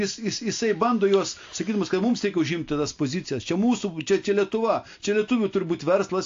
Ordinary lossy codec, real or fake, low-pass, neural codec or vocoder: AAC, 48 kbps; real; 7.2 kHz; none